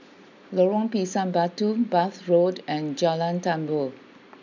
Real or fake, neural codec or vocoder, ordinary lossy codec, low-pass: real; none; none; 7.2 kHz